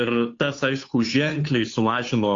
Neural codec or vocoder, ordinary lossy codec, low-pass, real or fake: codec, 16 kHz, 2 kbps, FunCodec, trained on Chinese and English, 25 frames a second; AAC, 48 kbps; 7.2 kHz; fake